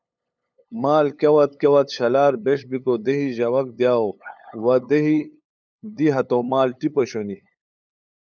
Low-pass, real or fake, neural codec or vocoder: 7.2 kHz; fake; codec, 16 kHz, 8 kbps, FunCodec, trained on LibriTTS, 25 frames a second